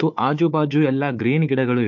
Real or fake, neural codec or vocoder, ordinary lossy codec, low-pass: fake; codec, 16 kHz, 4 kbps, FunCodec, trained on Chinese and English, 50 frames a second; MP3, 48 kbps; 7.2 kHz